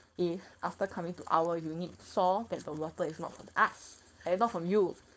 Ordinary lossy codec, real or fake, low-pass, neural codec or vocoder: none; fake; none; codec, 16 kHz, 4.8 kbps, FACodec